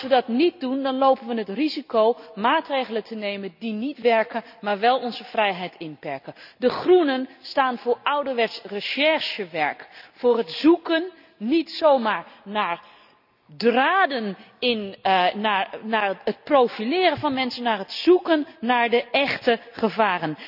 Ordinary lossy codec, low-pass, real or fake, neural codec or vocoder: none; 5.4 kHz; real; none